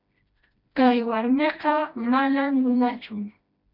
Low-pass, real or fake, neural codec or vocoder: 5.4 kHz; fake; codec, 16 kHz, 1 kbps, FreqCodec, smaller model